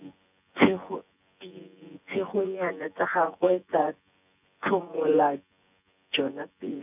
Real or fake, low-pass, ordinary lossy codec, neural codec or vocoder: fake; 3.6 kHz; none; vocoder, 24 kHz, 100 mel bands, Vocos